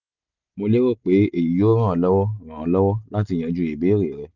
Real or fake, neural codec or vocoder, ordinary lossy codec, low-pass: real; none; none; 7.2 kHz